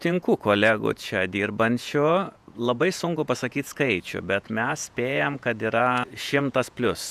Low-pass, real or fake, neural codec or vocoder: 14.4 kHz; fake; vocoder, 48 kHz, 128 mel bands, Vocos